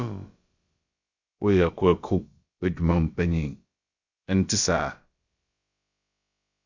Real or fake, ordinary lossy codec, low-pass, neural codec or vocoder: fake; Opus, 64 kbps; 7.2 kHz; codec, 16 kHz, about 1 kbps, DyCAST, with the encoder's durations